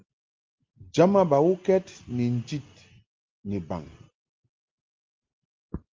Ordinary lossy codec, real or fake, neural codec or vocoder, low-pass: Opus, 24 kbps; real; none; 7.2 kHz